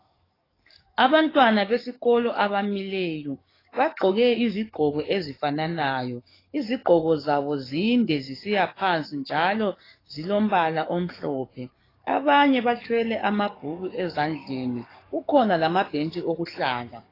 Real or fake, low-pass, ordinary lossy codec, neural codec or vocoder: fake; 5.4 kHz; AAC, 24 kbps; codec, 44.1 kHz, 7.8 kbps, DAC